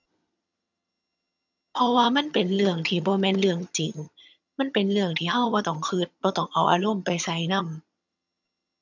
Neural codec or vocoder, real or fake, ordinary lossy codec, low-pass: vocoder, 22.05 kHz, 80 mel bands, HiFi-GAN; fake; none; 7.2 kHz